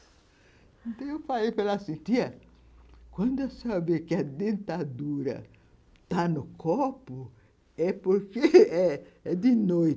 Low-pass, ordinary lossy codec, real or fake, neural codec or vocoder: none; none; real; none